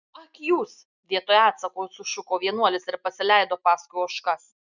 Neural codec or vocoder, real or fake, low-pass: none; real; 7.2 kHz